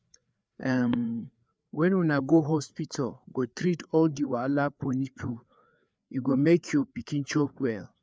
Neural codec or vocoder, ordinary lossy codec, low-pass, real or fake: codec, 16 kHz, 4 kbps, FreqCodec, larger model; none; none; fake